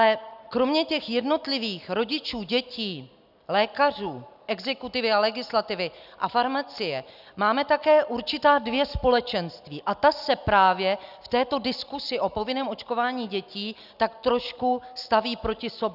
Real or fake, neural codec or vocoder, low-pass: real; none; 5.4 kHz